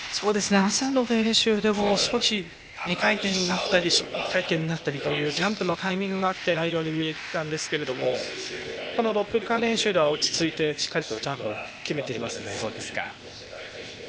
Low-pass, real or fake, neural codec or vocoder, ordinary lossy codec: none; fake; codec, 16 kHz, 0.8 kbps, ZipCodec; none